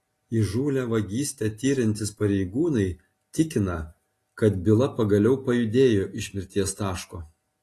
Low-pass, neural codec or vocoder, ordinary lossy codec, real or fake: 14.4 kHz; none; AAC, 48 kbps; real